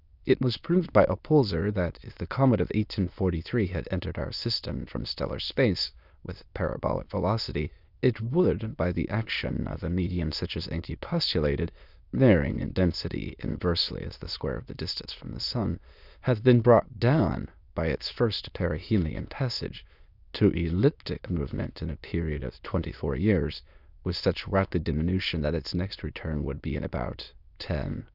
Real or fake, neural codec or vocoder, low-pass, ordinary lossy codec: fake; autoencoder, 22.05 kHz, a latent of 192 numbers a frame, VITS, trained on many speakers; 5.4 kHz; Opus, 64 kbps